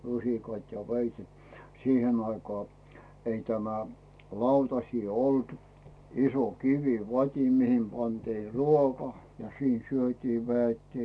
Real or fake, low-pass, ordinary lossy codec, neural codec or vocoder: real; none; none; none